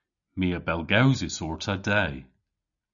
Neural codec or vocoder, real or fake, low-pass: none; real; 7.2 kHz